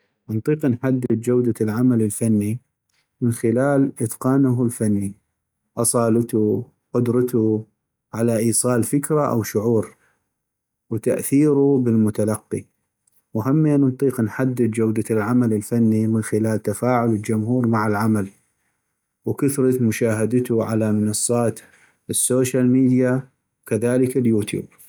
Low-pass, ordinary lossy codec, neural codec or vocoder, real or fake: none; none; none; real